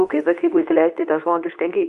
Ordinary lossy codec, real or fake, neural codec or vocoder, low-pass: Opus, 64 kbps; fake; codec, 24 kHz, 0.9 kbps, WavTokenizer, medium speech release version 2; 10.8 kHz